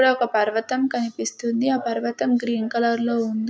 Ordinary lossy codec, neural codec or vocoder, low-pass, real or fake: none; none; none; real